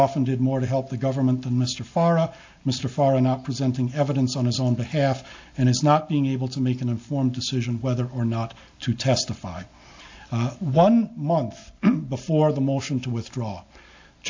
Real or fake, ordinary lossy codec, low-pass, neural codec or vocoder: real; AAC, 48 kbps; 7.2 kHz; none